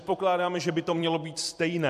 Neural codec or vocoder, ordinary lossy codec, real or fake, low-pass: vocoder, 44.1 kHz, 128 mel bands every 256 samples, BigVGAN v2; AAC, 96 kbps; fake; 14.4 kHz